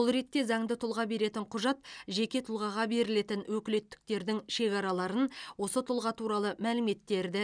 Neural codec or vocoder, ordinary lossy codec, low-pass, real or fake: none; none; 9.9 kHz; real